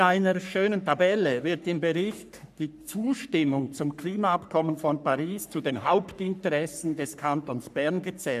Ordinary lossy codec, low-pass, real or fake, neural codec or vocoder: none; 14.4 kHz; fake; codec, 44.1 kHz, 3.4 kbps, Pupu-Codec